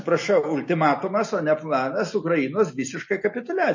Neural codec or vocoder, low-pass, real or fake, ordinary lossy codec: none; 7.2 kHz; real; MP3, 32 kbps